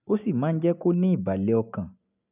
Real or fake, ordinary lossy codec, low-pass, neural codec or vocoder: real; none; 3.6 kHz; none